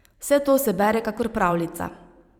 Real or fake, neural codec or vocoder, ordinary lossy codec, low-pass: fake; vocoder, 44.1 kHz, 128 mel bands every 512 samples, BigVGAN v2; Opus, 64 kbps; 19.8 kHz